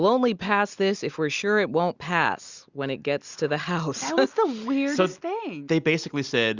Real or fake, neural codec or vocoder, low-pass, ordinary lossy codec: real; none; 7.2 kHz; Opus, 64 kbps